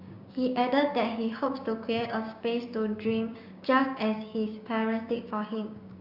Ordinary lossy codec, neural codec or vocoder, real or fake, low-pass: Opus, 64 kbps; codec, 16 kHz, 6 kbps, DAC; fake; 5.4 kHz